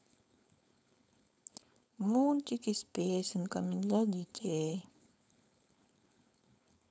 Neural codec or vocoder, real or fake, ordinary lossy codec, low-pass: codec, 16 kHz, 4.8 kbps, FACodec; fake; none; none